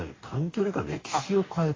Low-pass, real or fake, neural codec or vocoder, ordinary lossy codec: 7.2 kHz; fake; codec, 44.1 kHz, 2.6 kbps, DAC; AAC, 32 kbps